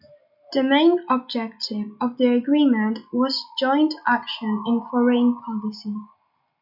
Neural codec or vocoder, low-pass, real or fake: none; 5.4 kHz; real